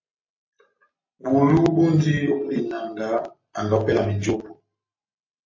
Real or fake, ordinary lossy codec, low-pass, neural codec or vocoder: real; MP3, 32 kbps; 7.2 kHz; none